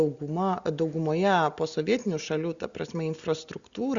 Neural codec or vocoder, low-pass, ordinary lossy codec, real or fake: none; 7.2 kHz; Opus, 64 kbps; real